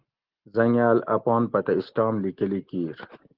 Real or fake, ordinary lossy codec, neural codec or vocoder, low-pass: real; Opus, 16 kbps; none; 5.4 kHz